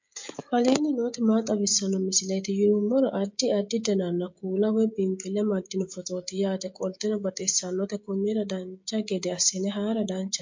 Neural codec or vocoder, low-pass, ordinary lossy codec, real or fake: codec, 16 kHz, 16 kbps, FreqCodec, smaller model; 7.2 kHz; MP3, 48 kbps; fake